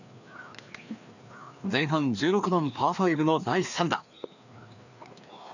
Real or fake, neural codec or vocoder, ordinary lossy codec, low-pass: fake; codec, 16 kHz, 2 kbps, FreqCodec, larger model; none; 7.2 kHz